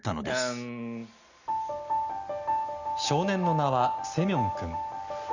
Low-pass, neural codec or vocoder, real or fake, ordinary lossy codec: 7.2 kHz; none; real; none